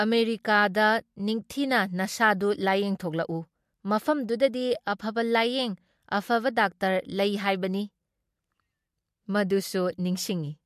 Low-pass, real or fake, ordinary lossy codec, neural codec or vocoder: 14.4 kHz; real; MP3, 64 kbps; none